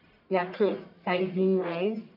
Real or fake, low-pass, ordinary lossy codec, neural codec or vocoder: fake; 5.4 kHz; none; codec, 44.1 kHz, 1.7 kbps, Pupu-Codec